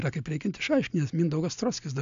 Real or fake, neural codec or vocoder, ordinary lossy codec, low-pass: real; none; AAC, 64 kbps; 7.2 kHz